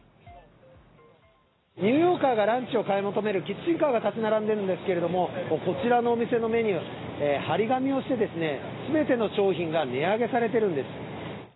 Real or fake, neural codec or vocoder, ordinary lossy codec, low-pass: real; none; AAC, 16 kbps; 7.2 kHz